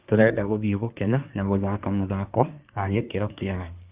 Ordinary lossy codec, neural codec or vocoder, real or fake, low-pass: Opus, 32 kbps; codec, 24 kHz, 1 kbps, SNAC; fake; 3.6 kHz